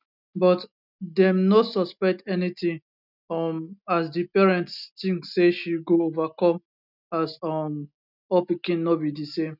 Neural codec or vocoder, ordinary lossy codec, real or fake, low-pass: none; none; real; 5.4 kHz